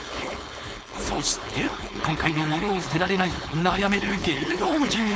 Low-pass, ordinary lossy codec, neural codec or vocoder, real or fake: none; none; codec, 16 kHz, 4.8 kbps, FACodec; fake